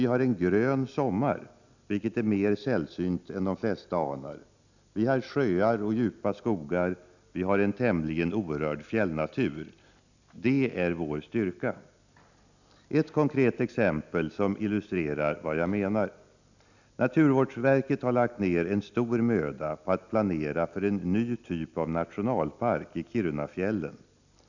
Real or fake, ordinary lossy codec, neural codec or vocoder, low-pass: real; none; none; 7.2 kHz